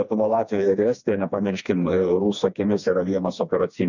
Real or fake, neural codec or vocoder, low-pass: fake; codec, 16 kHz, 2 kbps, FreqCodec, smaller model; 7.2 kHz